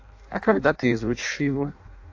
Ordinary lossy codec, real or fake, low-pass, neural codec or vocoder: AAC, 48 kbps; fake; 7.2 kHz; codec, 16 kHz in and 24 kHz out, 0.6 kbps, FireRedTTS-2 codec